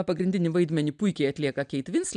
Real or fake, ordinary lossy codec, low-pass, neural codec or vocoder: real; AAC, 96 kbps; 9.9 kHz; none